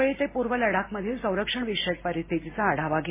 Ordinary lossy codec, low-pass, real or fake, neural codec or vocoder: MP3, 16 kbps; 3.6 kHz; real; none